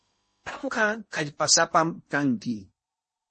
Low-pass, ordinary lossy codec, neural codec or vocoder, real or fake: 10.8 kHz; MP3, 32 kbps; codec, 16 kHz in and 24 kHz out, 0.8 kbps, FocalCodec, streaming, 65536 codes; fake